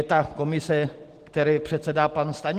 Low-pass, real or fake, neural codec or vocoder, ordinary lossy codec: 14.4 kHz; real; none; Opus, 16 kbps